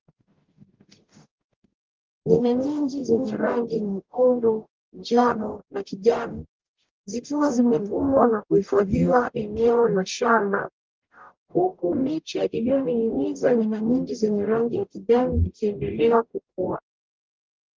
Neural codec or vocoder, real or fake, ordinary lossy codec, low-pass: codec, 44.1 kHz, 0.9 kbps, DAC; fake; Opus, 24 kbps; 7.2 kHz